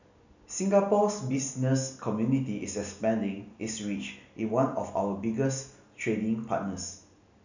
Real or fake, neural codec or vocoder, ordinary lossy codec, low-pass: real; none; none; 7.2 kHz